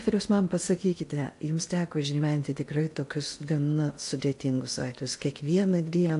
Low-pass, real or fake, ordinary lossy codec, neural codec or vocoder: 10.8 kHz; fake; AAC, 64 kbps; codec, 16 kHz in and 24 kHz out, 0.6 kbps, FocalCodec, streaming, 2048 codes